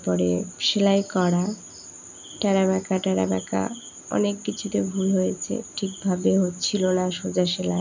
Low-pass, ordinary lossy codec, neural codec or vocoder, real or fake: 7.2 kHz; none; none; real